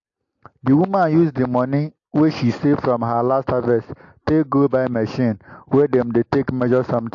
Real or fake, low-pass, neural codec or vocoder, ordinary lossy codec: real; 7.2 kHz; none; AAC, 48 kbps